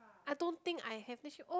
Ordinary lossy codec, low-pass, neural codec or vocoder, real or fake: none; none; none; real